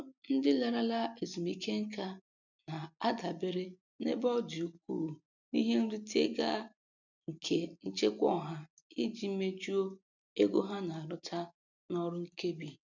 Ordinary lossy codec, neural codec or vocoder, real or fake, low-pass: none; none; real; 7.2 kHz